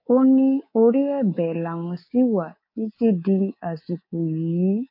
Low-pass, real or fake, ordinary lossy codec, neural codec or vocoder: 5.4 kHz; fake; MP3, 32 kbps; codec, 24 kHz, 3.1 kbps, DualCodec